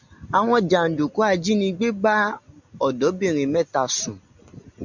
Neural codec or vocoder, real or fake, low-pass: none; real; 7.2 kHz